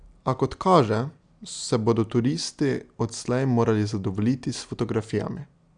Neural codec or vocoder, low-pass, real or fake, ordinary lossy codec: none; 9.9 kHz; real; none